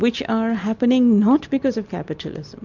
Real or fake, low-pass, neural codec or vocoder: real; 7.2 kHz; none